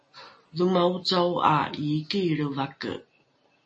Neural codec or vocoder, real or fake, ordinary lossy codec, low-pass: none; real; MP3, 32 kbps; 10.8 kHz